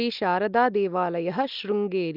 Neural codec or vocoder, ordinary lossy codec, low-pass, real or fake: none; Opus, 32 kbps; 5.4 kHz; real